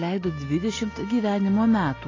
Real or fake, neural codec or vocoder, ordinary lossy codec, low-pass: real; none; AAC, 32 kbps; 7.2 kHz